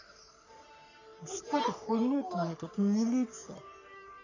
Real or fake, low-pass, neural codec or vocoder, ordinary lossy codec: fake; 7.2 kHz; codec, 44.1 kHz, 3.4 kbps, Pupu-Codec; none